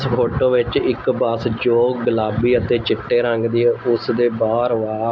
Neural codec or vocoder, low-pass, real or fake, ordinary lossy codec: none; none; real; none